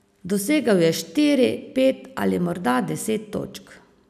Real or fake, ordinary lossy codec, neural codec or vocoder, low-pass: real; none; none; 14.4 kHz